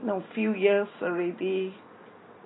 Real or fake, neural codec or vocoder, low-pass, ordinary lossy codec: real; none; 7.2 kHz; AAC, 16 kbps